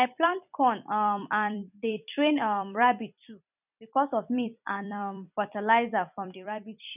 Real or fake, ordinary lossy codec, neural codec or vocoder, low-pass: fake; none; vocoder, 44.1 kHz, 128 mel bands every 256 samples, BigVGAN v2; 3.6 kHz